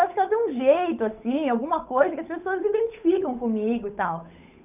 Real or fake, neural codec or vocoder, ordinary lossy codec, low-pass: fake; codec, 16 kHz, 8 kbps, FunCodec, trained on Chinese and English, 25 frames a second; none; 3.6 kHz